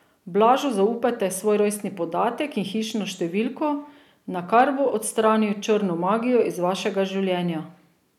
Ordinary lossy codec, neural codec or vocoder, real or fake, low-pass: none; none; real; 19.8 kHz